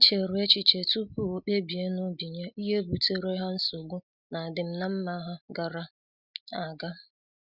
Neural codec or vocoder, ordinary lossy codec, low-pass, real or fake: none; Opus, 64 kbps; 5.4 kHz; real